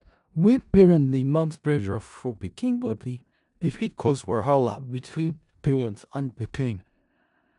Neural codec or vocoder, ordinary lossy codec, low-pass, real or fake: codec, 16 kHz in and 24 kHz out, 0.4 kbps, LongCat-Audio-Codec, four codebook decoder; none; 10.8 kHz; fake